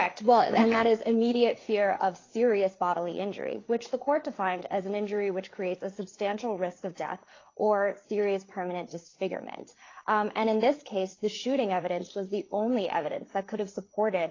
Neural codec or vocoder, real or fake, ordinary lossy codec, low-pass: codec, 16 kHz, 4 kbps, FreqCodec, larger model; fake; AAC, 32 kbps; 7.2 kHz